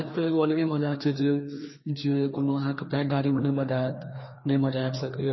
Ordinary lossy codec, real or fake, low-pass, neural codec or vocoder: MP3, 24 kbps; fake; 7.2 kHz; codec, 16 kHz, 2 kbps, FreqCodec, larger model